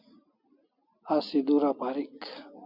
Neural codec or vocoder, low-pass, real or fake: none; 5.4 kHz; real